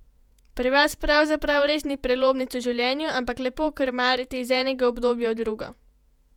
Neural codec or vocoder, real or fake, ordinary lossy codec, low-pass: vocoder, 48 kHz, 128 mel bands, Vocos; fake; none; 19.8 kHz